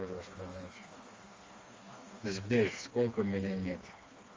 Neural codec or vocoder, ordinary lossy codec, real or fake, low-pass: codec, 16 kHz, 2 kbps, FreqCodec, smaller model; Opus, 32 kbps; fake; 7.2 kHz